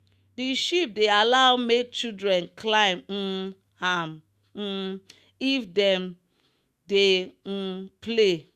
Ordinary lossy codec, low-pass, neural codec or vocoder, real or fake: Opus, 64 kbps; 14.4 kHz; autoencoder, 48 kHz, 128 numbers a frame, DAC-VAE, trained on Japanese speech; fake